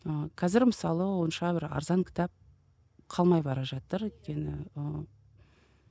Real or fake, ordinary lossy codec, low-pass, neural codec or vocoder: real; none; none; none